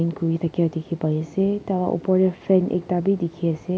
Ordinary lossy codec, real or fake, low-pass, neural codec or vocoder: none; real; none; none